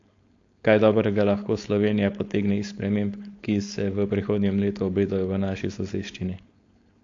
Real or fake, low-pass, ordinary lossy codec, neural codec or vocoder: fake; 7.2 kHz; AAC, 48 kbps; codec, 16 kHz, 4.8 kbps, FACodec